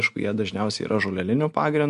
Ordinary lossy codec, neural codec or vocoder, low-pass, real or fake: MP3, 64 kbps; none; 10.8 kHz; real